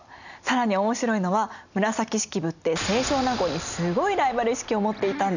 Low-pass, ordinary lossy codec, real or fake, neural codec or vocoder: 7.2 kHz; none; real; none